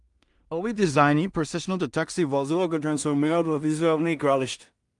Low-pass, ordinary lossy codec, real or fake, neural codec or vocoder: 10.8 kHz; Opus, 32 kbps; fake; codec, 16 kHz in and 24 kHz out, 0.4 kbps, LongCat-Audio-Codec, two codebook decoder